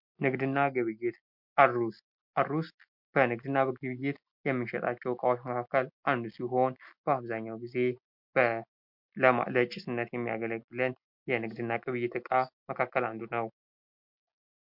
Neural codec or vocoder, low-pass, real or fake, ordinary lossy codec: none; 5.4 kHz; real; MP3, 48 kbps